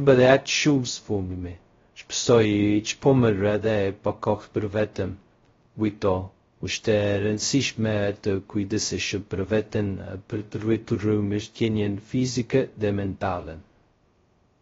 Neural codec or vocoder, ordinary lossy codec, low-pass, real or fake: codec, 16 kHz, 0.2 kbps, FocalCodec; AAC, 24 kbps; 7.2 kHz; fake